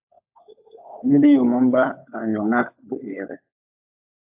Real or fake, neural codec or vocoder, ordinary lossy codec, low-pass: fake; codec, 16 kHz, 16 kbps, FunCodec, trained on LibriTTS, 50 frames a second; AAC, 32 kbps; 3.6 kHz